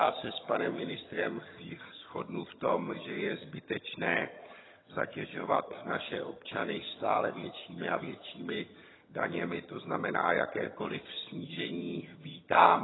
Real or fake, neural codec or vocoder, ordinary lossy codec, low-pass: fake; vocoder, 22.05 kHz, 80 mel bands, HiFi-GAN; AAC, 16 kbps; 7.2 kHz